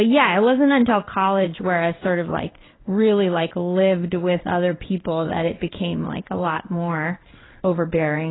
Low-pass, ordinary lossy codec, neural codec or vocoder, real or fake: 7.2 kHz; AAC, 16 kbps; codec, 16 kHz in and 24 kHz out, 1 kbps, XY-Tokenizer; fake